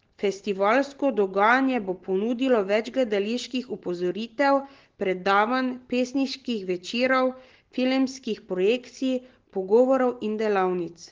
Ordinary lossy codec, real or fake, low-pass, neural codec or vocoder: Opus, 16 kbps; real; 7.2 kHz; none